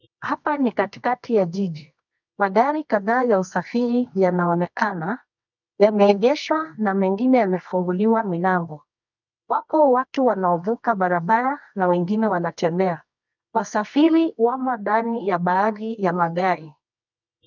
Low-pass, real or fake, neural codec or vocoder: 7.2 kHz; fake; codec, 24 kHz, 0.9 kbps, WavTokenizer, medium music audio release